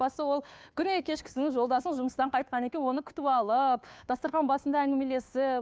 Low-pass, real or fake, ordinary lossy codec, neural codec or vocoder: none; fake; none; codec, 16 kHz, 2 kbps, FunCodec, trained on Chinese and English, 25 frames a second